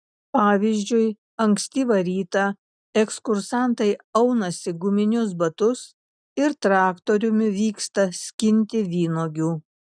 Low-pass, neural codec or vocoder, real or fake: 9.9 kHz; none; real